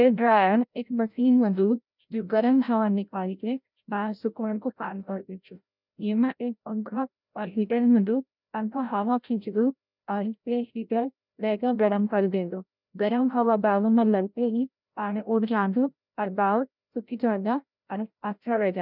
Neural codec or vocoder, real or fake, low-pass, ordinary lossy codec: codec, 16 kHz, 0.5 kbps, FreqCodec, larger model; fake; 5.4 kHz; none